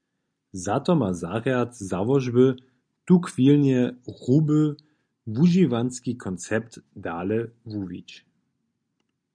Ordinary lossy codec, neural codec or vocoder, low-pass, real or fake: MP3, 96 kbps; none; 9.9 kHz; real